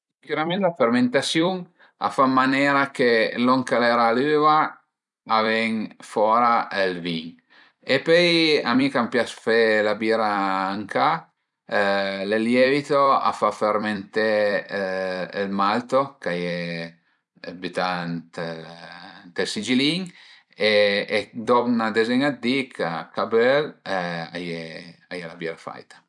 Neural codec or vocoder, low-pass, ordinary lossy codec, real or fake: vocoder, 44.1 kHz, 128 mel bands every 256 samples, BigVGAN v2; 10.8 kHz; none; fake